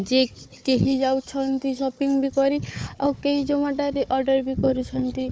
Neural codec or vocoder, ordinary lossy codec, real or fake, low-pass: codec, 16 kHz, 4 kbps, FunCodec, trained on Chinese and English, 50 frames a second; none; fake; none